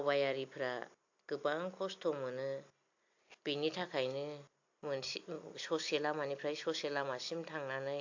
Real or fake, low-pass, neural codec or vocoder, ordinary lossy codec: real; 7.2 kHz; none; AAC, 48 kbps